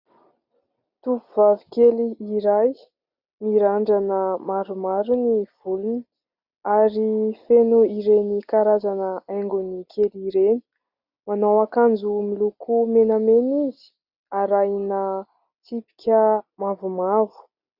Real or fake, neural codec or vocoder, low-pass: real; none; 5.4 kHz